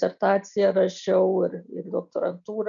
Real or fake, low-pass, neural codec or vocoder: real; 7.2 kHz; none